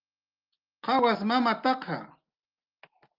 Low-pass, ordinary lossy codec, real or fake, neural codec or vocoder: 5.4 kHz; Opus, 24 kbps; real; none